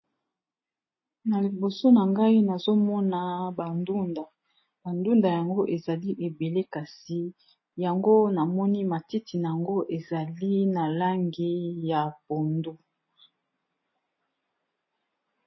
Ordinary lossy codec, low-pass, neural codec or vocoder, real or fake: MP3, 24 kbps; 7.2 kHz; none; real